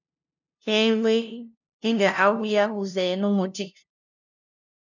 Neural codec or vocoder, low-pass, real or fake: codec, 16 kHz, 0.5 kbps, FunCodec, trained on LibriTTS, 25 frames a second; 7.2 kHz; fake